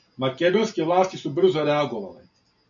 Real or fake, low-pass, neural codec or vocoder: real; 7.2 kHz; none